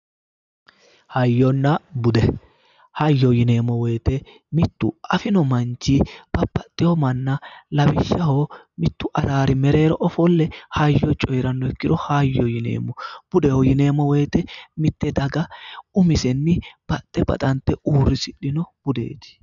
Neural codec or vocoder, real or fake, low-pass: none; real; 7.2 kHz